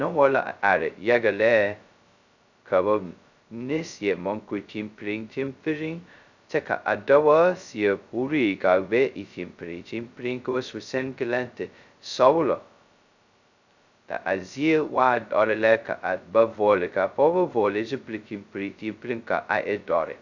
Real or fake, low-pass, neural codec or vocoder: fake; 7.2 kHz; codec, 16 kHz, 0.2 kbps, FocalCodec